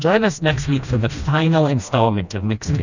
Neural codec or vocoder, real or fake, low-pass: codec, 16 kHz, 1 kbps, FreqCodec, smaller model; fake; 7.2 kHz